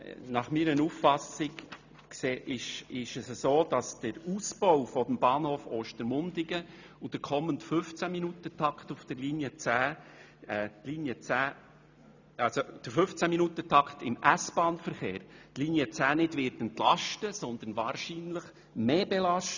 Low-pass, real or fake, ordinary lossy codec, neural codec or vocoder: 7.2 kHz; real; none; none